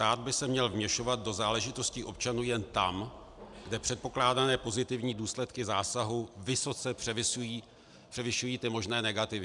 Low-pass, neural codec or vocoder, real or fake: 10.8 kHz; none; real